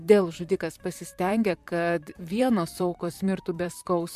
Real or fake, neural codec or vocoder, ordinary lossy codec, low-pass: fake; vocoder, 44.1 kHz, 128 mel bands, Pupu-Vocoder; MP3, 96 kbps; 14.4 kHz